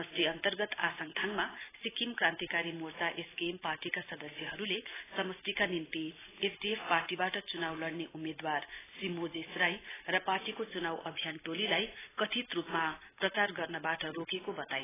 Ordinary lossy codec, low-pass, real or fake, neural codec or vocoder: AAC, 16 kbps; 3.6 kHz; real; none